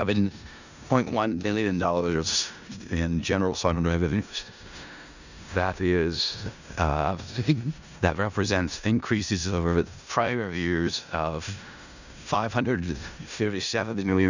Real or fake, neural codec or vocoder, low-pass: fake; codec, 16 kHz in and 24 kHz out, 0.4 kbps, LongCat-Audio-Codec, four codebook decoder; 7.2 kHz